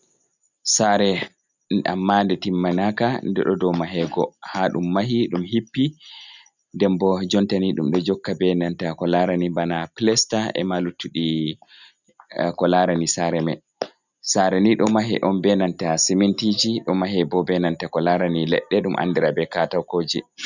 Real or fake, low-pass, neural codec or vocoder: real; 7.2 kHz; none